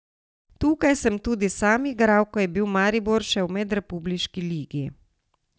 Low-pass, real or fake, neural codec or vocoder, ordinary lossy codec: none; real; none; none